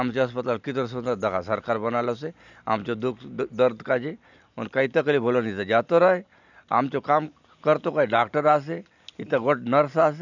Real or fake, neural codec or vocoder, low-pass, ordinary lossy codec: real; none; 7.2 kHz; none